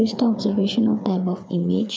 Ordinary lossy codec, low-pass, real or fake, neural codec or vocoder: none; none; fake; codec, 16 kHz, 4 kbps, FreqCodec, larger model